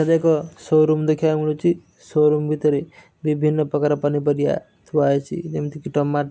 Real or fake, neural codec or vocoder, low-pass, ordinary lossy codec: real; none; none; none